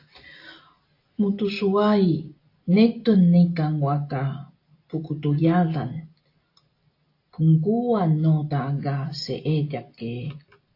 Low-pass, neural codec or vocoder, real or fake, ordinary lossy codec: 5.4 kHz; none; real; AAC, 32 kbps